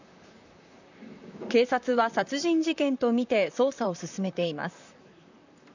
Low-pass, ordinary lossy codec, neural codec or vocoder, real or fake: 7.2 kHz; none; vocoder, 44.1 kHz, 128 mel bands, Pupu-Vocoder; fake